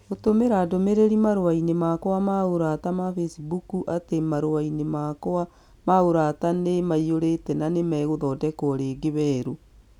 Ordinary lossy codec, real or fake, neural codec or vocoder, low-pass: none; real; none; 19.8 kHz